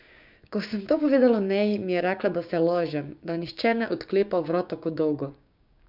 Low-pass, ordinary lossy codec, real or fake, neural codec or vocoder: 5.4 kHz; none; fake; codec, 16 kHz, 6 kbps, DAC